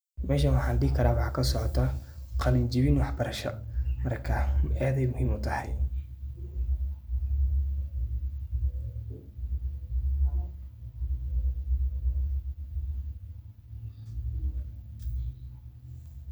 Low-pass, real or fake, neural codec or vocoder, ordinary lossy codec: none; real; none; none